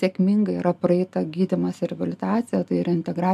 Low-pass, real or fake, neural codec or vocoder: 14.4 kHz; real; none